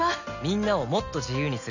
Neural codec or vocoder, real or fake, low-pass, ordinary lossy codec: none; real; 7.2 kHz; none